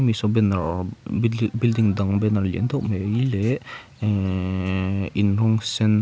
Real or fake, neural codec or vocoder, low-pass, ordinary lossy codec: real; none; none; none